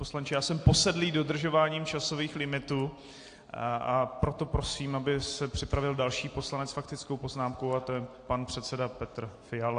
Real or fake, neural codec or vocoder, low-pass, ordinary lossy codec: real; none; 9.9 kHz; AAC, 48 kbps